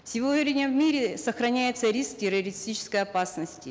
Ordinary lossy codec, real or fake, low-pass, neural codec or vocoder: none; real; none; none